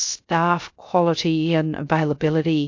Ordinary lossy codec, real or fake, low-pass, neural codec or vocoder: AAC, 48 kbps; fake; 7.2 kHz; codec, 16 kHz, 0.3 kbps, FocalCodec